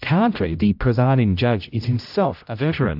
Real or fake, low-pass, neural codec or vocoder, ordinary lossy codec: fake; 5.4 kHz; codec, 16 kHz, 0.5 kbps, X-Codec, HuBERT features, trained on general audio; AAC, 48 kbps